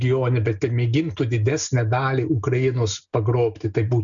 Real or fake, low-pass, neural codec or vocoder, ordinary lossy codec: real; 7.2 kHz; none; MP3, 96 kbps